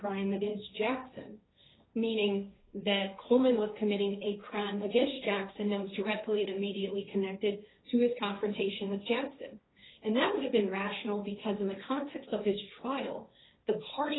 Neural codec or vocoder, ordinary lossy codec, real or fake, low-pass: codec, 16 kHz, 1.1 kbps, Voila-Tokenizer; AAC, 16 kbps; fake; 7.2 kHz